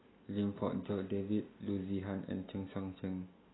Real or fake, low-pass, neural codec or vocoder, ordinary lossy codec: real; 7.2 kHz; none; AAC, 16 kbps